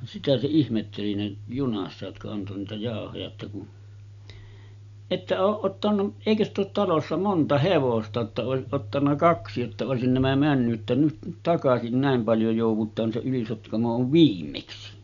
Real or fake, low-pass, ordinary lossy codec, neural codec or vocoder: real; 7.2 kHz; none; none